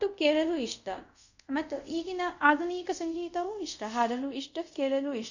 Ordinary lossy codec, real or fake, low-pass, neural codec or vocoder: none; fake; 7.2 kHz; codec, 24 kHz, 0.5 kbps, DualCodec